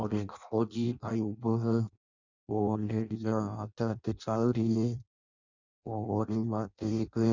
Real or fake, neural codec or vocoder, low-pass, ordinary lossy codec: fake; codec, 16 kHz in and 24 kHz out, 0.6 kbps, FireRedTTS-2 codec; 7.2 kHz; none